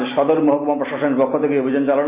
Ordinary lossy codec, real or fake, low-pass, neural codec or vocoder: Opus, 64 kbps; real; 3.6 kHz; none